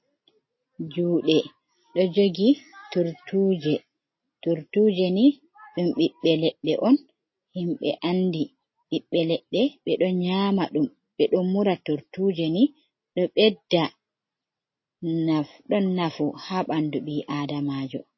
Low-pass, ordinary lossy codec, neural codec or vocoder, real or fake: 7.2 kHz; MP3, 24 kbps; none; real